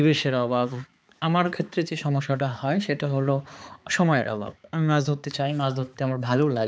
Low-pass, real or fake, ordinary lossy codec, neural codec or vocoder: none; fake; none; codec, 16 kHz, 4 kbps, X-Codec, HuBERT features, trained on balanced general audio